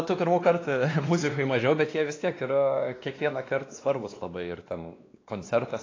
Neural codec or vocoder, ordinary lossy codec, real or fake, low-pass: codec, 16 kHz, 2 kbps, X-Codec, WavLM features, trained on Multilingual LibriSpeech; AAC, 32 kbps; fake; 7.2 kHz